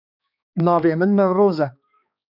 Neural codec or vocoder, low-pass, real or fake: codec, 16 kHz, 2 kbps, X-Codec, HuBERT features, trained on balanced general audio; 5.4 kHz; fake